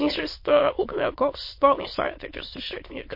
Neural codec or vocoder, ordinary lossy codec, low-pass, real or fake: autoencoder, 22.05 kHz, a latent of 192 numbers a frame, VITS, trained on many speakers; MP3, 32 kbps; 5.4 kHz; fake